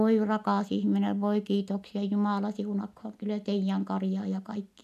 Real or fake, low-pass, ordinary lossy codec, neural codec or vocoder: real; 14.4 kHz; none; none